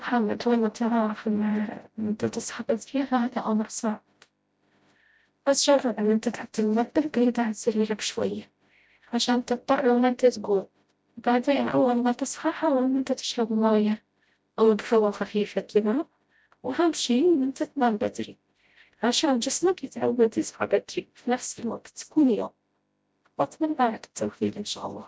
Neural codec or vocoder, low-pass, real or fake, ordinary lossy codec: codec, 16 kHz, 0.5 kbps, FreqCodec, smaller model; none; fake; none